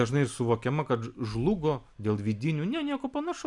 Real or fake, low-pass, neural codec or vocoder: real; 10.8 kHz; none